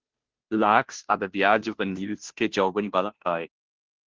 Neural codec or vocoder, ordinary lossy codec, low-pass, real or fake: codec, 16 kHz, 0.5 kbps, FunCodec, trained on Chinese and English, 25 frames a second; Opus, 16 kbps; 7.2 kHz; fake